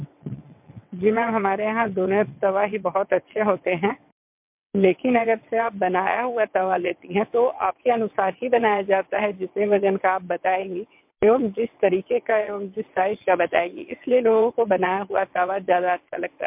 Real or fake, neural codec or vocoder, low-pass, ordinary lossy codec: fake; vocoder, 22.05 kHz, 80 mel bands, WaveNeXt; 3.6 kHz; MP3, 32 kbps